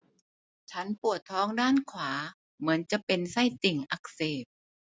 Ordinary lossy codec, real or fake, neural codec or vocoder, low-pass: none; real; none; none